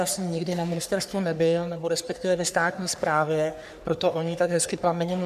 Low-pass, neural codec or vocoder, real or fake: 14.4 kHz; codec, 44.1 kHz, 3.4 kbps, Pupu-Codec; fake